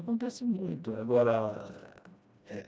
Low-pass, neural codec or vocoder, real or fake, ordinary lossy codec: none; codec, 16 kHz, 1 kbps, FreqCodec, smaller model; fake; none